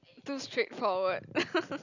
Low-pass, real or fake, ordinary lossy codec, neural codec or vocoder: 7.2 kHz; real; none; none